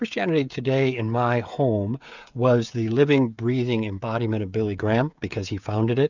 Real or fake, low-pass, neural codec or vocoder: fake; 7.2 kHz; codec, 16 kHz, 16 kbps, FreqCodec, smaller model